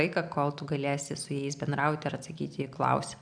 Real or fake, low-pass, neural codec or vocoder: real; 9.9 kHz; none